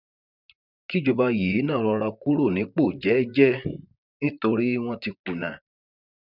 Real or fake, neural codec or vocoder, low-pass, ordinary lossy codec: fake; vocoder, 44.1 kHz, 128 mel bands, Pupu-Vocoder; 5.4 kHz; none